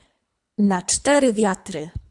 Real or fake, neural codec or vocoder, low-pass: fake; codec, 24 kHz, 3 kbps, HILCodec; 10.8 kHz